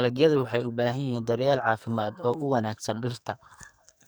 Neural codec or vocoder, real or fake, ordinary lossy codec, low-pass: codec, 44.1 kHz, 2.6 kbps, SNAC; fake; none; none